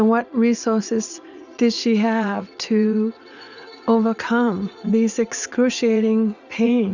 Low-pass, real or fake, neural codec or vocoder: 7.2 kHz; fake; vocoder, 44.1 kHz, 80 mel bands, Vocos